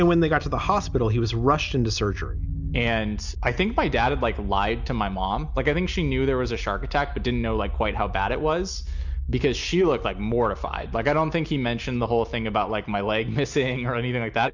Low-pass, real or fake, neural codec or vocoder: 7.2 kHz; real; none